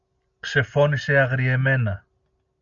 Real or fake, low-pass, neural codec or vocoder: real; 7.2 kHz; none